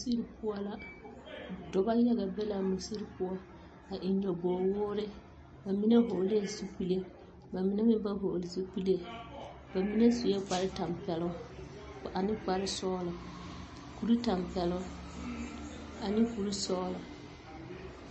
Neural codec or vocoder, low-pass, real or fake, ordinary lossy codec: none; 10.8 kHz; real; MP3, 32 kbps